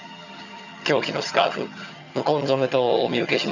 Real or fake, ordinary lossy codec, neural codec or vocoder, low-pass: fake; none; vocoder, 22.05 kHz, 80 mel bands, HiFi-GAN; 7.2 kHz